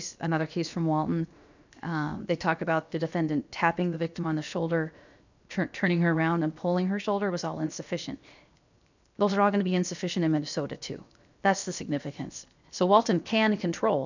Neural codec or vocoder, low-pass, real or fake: codec, 16 kHz, 0.7 kbps, FocalCodec; 7.2 kHz; fake